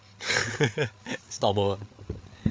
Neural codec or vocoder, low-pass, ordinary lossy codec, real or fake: codec, 16 kHz, 8 kbps, FreqCodec, larger model; none; none; fake